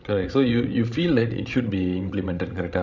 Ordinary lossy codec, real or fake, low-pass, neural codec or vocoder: none; fake; 7.2 kHz; codec, 16 kHz, 16 kbps, FreqCodec, larger model